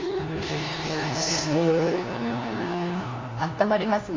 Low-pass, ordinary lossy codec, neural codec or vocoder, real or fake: 7.2 kHz; AAC, 32 kbps; codec, 16 kHz, 1 kbps, FunCodec, trained on LibriTTS, 50 frames a second; fake